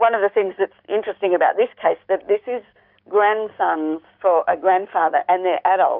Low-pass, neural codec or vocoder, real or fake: 5.4 kHz; codec, 16 kHz, 6 kbps, DAC; fake